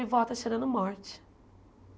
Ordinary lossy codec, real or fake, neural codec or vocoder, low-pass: none; real; none; none